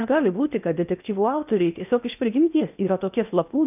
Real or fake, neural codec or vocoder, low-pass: fake; codec, 16 kHz in and 24 kHz out, 0.8 kbps, FocalCodec, streaming, 65536 codes; 3.6 kHz